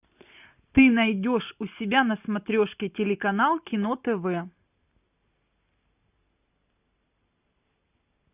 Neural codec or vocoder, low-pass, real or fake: none; 3.6 kHz; real